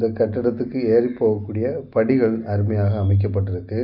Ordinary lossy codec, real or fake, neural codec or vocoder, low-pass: none; real; none; 5.4 kHz